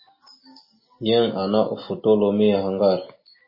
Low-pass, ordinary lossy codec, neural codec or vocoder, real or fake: 5.4 kHz; MP3, 24 kbps; none; real